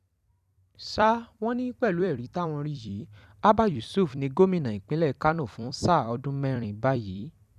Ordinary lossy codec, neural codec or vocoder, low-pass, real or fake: none; vocoder, 44.1 kHz, 128 mel bands every 256 samples, BigVGAN v2; 14.4 kHz; fake